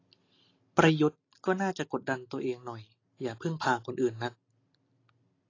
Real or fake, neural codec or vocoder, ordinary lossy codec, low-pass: real; none; AAC, 32 kbps; 7.2 kHz